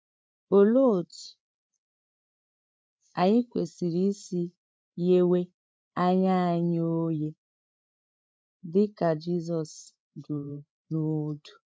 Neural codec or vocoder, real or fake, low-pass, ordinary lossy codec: codec, 16 kHz, 8 kbps, FreqCodec, larger model; fake; none; none